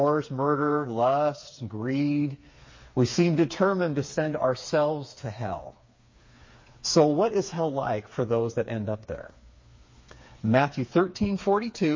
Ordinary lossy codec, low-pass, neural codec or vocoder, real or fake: MP3, 32 kbps; 7.2 kHz; codec, 16 kHz, 4 kbps, FreqCodec, smaller model; fake